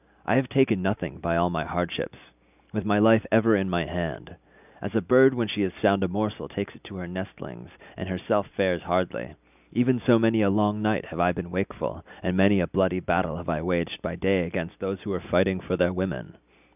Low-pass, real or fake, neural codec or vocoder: 3.6 kHz; real; none